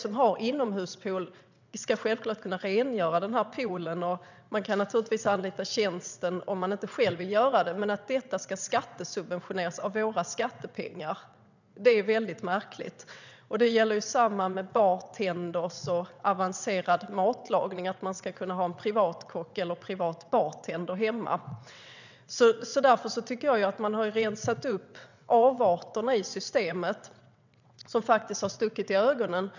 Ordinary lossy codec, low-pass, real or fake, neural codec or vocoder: none; 7.2 kHz; fake; vocoder, 22.05 kHz, 80 mel bands, WaveNeXt